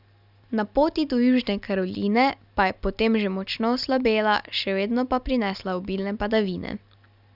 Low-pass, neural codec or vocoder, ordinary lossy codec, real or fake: 5.4 kHz; none; none; real